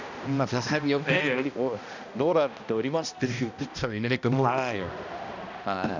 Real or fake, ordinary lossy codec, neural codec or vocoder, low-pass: fake; none; codec, 16 kHz, 1 kbps, X-Codec, HuBERT features, trained on balanced general audio; 7.2 kHz